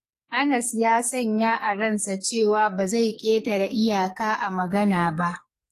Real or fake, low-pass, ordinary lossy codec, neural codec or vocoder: fake; 14.4 kHz; AAC, 48 kbps; codec, 44.1 kHz, 2.6 kbps, SNAC